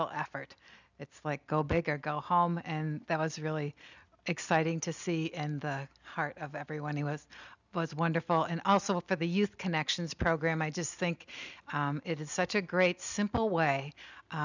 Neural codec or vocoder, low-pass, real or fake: none; 7.2 kHz; real